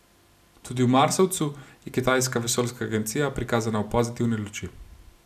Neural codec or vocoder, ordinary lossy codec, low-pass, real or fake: none; none; 14.4 kHz; real